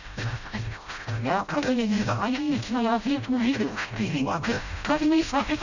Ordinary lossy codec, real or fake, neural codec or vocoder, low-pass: none; fake; codec, 16 kHz, 0.5 kbps, FreqCodec, smaller model; 7.2 kHz